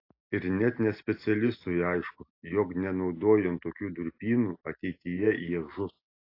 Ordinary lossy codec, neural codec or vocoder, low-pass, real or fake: AAC, 32 kbps; none; 5.4 kHz; real